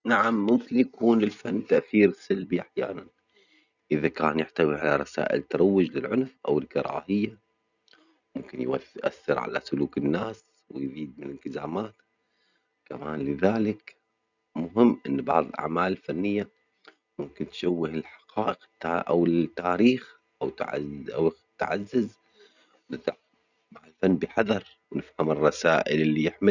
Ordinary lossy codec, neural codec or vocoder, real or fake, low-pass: none; none; real; 7.2 kHz